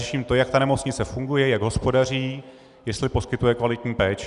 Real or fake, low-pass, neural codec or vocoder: real; 10.8 kHz; none